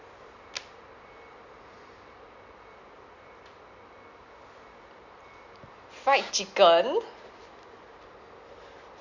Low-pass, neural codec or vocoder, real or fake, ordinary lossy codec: 7.2 kHz; none; real; none